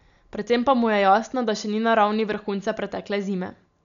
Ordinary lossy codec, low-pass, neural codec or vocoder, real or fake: none; 7.2 kHz; none; real